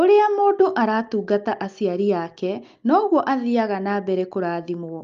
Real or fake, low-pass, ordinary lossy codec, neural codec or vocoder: real; 7.2 kHz; Opus, 32 kbps; none